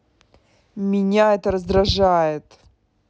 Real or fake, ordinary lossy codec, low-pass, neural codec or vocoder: real; none; none; none